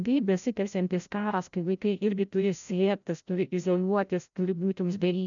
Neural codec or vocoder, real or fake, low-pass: codec, 16 kHz, 0.5 kbps, FreqCodec, larger model; fake; 7.2 kHz